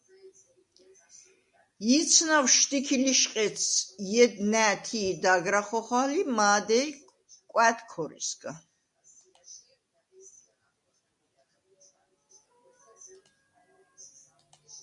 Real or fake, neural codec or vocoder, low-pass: real; none; 10.8 kHz